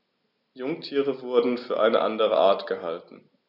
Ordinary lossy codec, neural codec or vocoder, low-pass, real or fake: none; none; 5.4 kHz; real